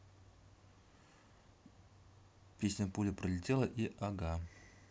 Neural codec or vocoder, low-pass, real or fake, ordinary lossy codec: none; none; real; none